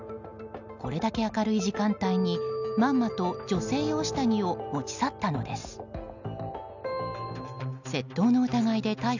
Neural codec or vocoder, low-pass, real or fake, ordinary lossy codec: none; 7.2 kHz; real; none